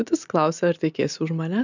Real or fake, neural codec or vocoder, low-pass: real; none; 7.2 kHz